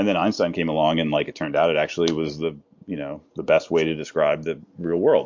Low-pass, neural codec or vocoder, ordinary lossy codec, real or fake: 7.2 kHz; none; MP3, 48 kbps; real